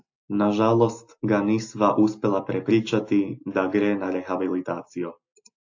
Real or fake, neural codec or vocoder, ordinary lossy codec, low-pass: real; none; AAC, 48 kbps; 7.2 kHz